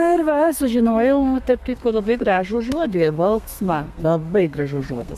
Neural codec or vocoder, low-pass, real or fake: codec, 32 kHz, 1.9 kbps, SNAC; 14.4 kHz; fake